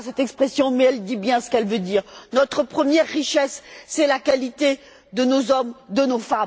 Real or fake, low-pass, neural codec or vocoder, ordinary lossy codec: real; none; none; none